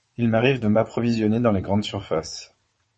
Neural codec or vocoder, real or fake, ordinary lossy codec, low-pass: vocoder, 22.05 kHz, 80 mel bands, WaveNeXt; fake; MP3, 32 kbps; 9.9 kHz